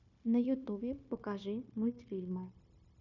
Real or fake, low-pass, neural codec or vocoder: fake; 7.2 kHz; codec, 16 kHz, 0.9 kbps, LongCat-Audio-Codec